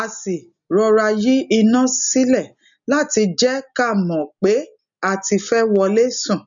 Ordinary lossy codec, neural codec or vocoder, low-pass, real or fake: none; none; 7.2 kHz; real